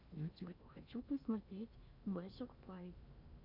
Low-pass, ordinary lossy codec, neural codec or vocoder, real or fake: 5.4 kHz; MP3, 48 kbps; codec, 16 kHz in and 24 kHz out, 0.8 kbps, FocalCodec, streaming, 65536 codes; fake